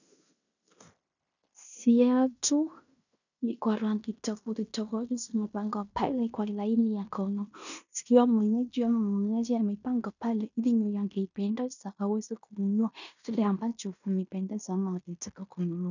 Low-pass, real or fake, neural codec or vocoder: 7.2 kHz; fake; codec, 16 kHz in and 24 kHz out, 0.9 kbps, LongCat-Audio-Codec, fine tuned four codebook decoder